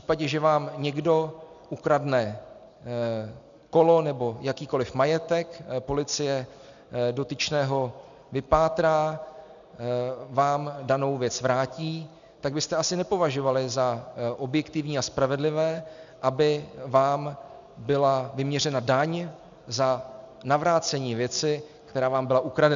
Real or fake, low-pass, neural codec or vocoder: real; 7.2 kHz; none